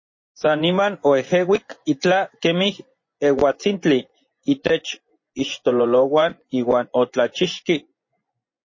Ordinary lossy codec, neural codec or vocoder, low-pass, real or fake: MP3, 32 kbps; vocoder, 24 kHz, 100 mel bands, Vocos; 7.2 kHz; fake